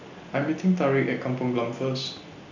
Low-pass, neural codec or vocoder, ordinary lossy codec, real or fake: 7.2 kHz; none; none; real